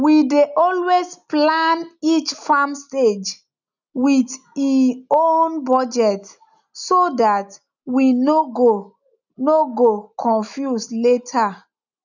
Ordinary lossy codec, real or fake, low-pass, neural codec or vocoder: none; real; 7.2 kHz; none